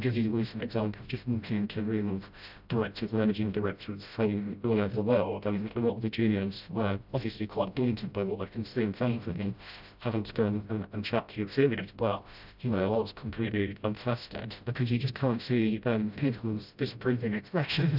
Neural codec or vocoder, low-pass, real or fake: codec, 16 kHz, 0.5 kbps, FreqCodec, smaller model; 5.4 kHz; fake